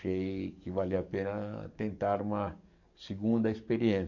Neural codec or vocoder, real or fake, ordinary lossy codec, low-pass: codec, 44.1 kHz, 7.8 kbps, DAC; fake; none; 7.2 kHz